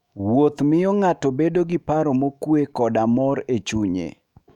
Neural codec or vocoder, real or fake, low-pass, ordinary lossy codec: autoencoder, 48 kHz, 128 numbers a frame, DAC-VAE, trained on Japanese speech; fake; 19.8 kHz; none